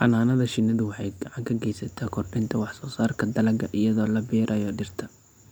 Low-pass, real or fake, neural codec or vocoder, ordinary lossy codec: none; real; none; none